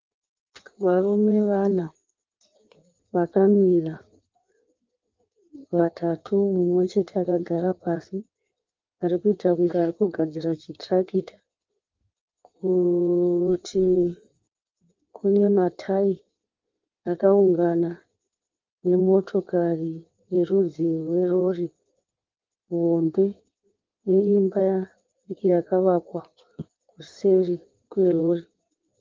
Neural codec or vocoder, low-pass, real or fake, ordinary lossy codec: codec, 16 kHz in and 24 kHz out, 1.1 kbps, FireRedTTS-2 codec; 7.2 kHz; fake; Opus, 32 kbps